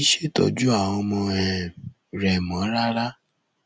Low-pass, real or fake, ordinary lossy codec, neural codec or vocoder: none; real; none; none